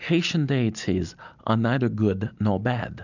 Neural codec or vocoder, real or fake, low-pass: none; real; 7.2 kHz